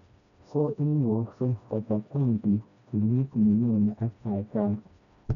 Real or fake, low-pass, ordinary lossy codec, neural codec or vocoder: fake; 7.2 kHz; none; codec, 16 kHz, 1 kbps, FreqCodec, smaller model